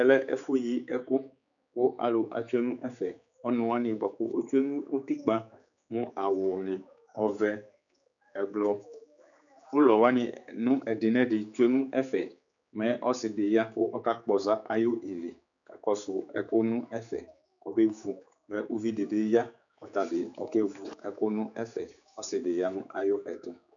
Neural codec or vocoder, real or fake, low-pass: codec, 16 kHz, 4 kbps, X-Codec, HuBERT features, trained on general audio; fake; 7.2 kHz